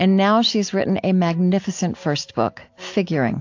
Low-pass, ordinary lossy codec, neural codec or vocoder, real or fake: 7.2 kHz; AAC, 48 kbps; none; real